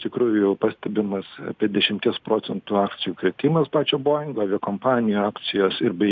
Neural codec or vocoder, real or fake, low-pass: none; real; 7.2 kHz